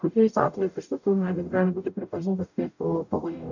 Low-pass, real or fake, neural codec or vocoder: 7.2 kHz; fake; codec, 44.1 kHz, 0.9 kbps, DAC